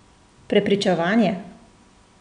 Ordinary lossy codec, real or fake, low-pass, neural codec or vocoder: none; real; 9.9 kHz; none